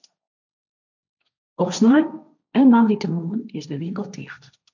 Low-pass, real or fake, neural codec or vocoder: 7.2 kHz; fake; codec, 16 kHz, 1.1 kbps, Voila-Tokenizer